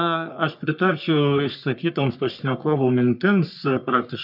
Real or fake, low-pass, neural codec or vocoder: fake; 5.4 kHz; codec, 44.1 kHz, 3.4 kbps, Pupu-Codec